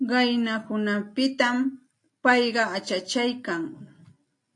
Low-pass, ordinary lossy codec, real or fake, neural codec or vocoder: 10.8 kHz; AAC, 48 kbps; real; none